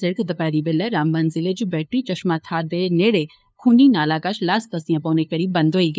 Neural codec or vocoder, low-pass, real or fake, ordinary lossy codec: codec, 16 kHz, 2 kbps, FunCodec, trained on LibriTTS, 25 frames a second; none; fake; none